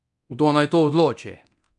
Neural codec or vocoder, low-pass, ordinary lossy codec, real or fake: codec, 24 kHz, 0.9 kbps, DualCodec; 10.8 kHz; none; fake